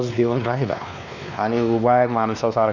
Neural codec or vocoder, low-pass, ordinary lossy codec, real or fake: codec, 16 kHz, 2 kbps, X-Codec, WavLM features, trained on Multilingual LibriSpeech; 7.2 kHz; none; fake